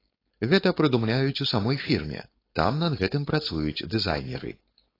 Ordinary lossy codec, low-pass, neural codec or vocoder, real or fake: AAC, 24 kbps; 5.4 kHz; codec, 16 kHz, 4.8 kbps, FACodec; fake